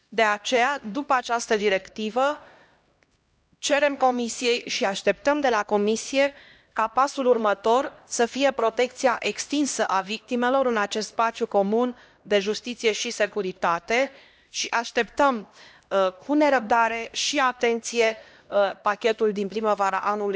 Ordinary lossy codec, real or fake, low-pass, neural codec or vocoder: none; fake; none; codec, 16 kHz, 1 kbps, X-Codec, HuBERT features, trained on LibriSpeech